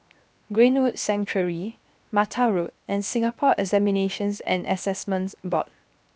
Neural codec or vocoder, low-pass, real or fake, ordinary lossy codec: codec, 16 kHz, 0.7 kbps, FocalCodec; none; fake; none